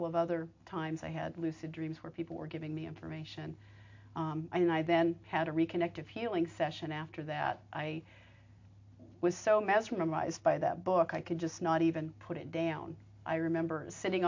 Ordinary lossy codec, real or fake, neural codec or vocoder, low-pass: MP3, 48 kbps; real; none; 7.2 kHz